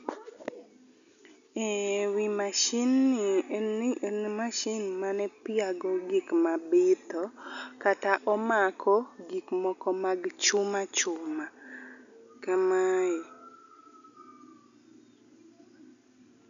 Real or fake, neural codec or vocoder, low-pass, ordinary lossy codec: real; none; 7.2 kHz; none